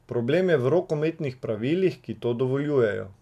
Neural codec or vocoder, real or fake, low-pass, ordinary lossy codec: none; real; 14.4 kHz; none